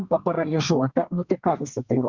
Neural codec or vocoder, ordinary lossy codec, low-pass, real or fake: codec, 32 kHz, 1.9 kbps, SNAC; Opus, 64 kbps; 7.2 kHz; fake